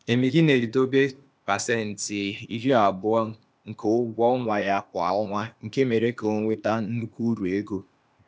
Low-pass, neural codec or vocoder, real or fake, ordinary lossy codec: none; codec, 16 kHz, 0.8 kbps, ZipCodec; fake; none